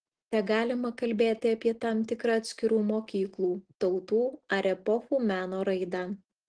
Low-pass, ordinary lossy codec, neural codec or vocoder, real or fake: 9.9 kHz; Opus, 16 kbps; none; real